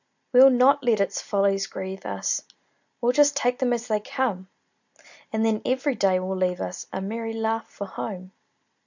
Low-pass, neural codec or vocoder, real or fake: 7.2 kHz; none; real